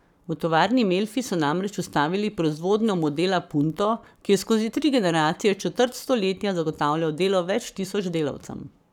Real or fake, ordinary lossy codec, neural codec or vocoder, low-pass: fake; none; codec, 44.1 kHz, 7.8 kbps, Pupu-Codec; 19.8 kHz